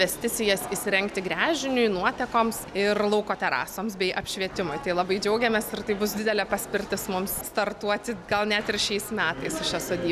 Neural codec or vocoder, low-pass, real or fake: none; 14.4 kHz; real